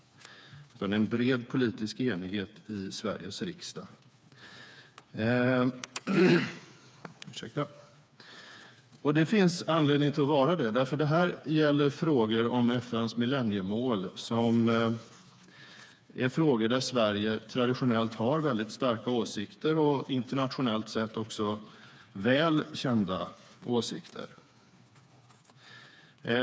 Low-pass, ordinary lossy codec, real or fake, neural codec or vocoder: none; none; fake; codec, 16 kHz, 4 kbps, FreqCodec, smaller model